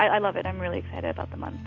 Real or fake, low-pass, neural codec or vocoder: real; 7.2 kHz; none